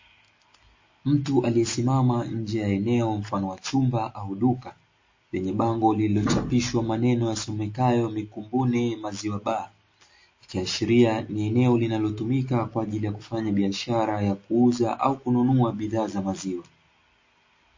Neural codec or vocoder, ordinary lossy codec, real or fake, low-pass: none; MP3, 32 kbps; real; 7.2 kHz